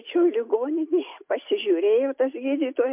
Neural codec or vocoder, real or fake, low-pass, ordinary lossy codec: none; real; 3.6 kHz; MP3, 32 kbps